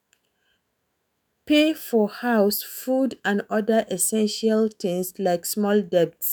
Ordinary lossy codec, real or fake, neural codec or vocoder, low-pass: none; fake; autoencoder, 48 kHz, 128 numbers a frame, DAC-VAE, trained on Japanese speech; none